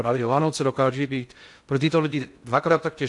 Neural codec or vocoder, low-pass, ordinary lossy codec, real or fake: codec, 16 kHz in and 24 kHz out, 0.6 kbps, FocalCodec, streaming, 2048 codes; 10.8 kHz; AAC, 64 kbps; fake